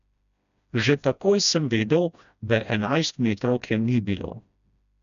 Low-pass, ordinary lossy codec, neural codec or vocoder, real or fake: 7.2 kHz; none; codec, 16 kHz, 1 kbps, FreqCodec, smaller model; fake